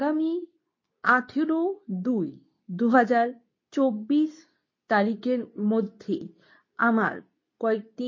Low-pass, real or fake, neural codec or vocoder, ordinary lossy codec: 7.2 kHz; fake; codec, 24 kHz, 0.9 kbps, WavTokenizer, medium speech release version 2; MP3, 32 kbps